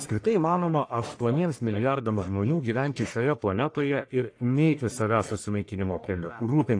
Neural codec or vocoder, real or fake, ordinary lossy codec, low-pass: codec, 44.1 kHz, 1.7 kbps, Pupu-Codec; fake; AAC, 48 kbps; 9.9 kHz